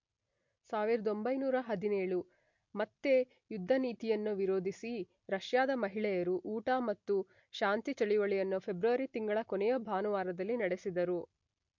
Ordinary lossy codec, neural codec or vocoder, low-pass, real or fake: MP3, 48 kbps; none; 7.2 kHz; real